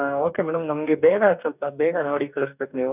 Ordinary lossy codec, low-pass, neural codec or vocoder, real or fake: none; 3.6 kHz; codec, 44.1 kHz, 2.6 kbps, DAC; fake